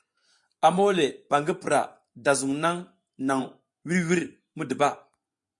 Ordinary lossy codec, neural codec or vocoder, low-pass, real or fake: MP3, 64 kbps; vocoder, 24 kHz, 100 mel bands, Vocos; 10.8 kHz; fake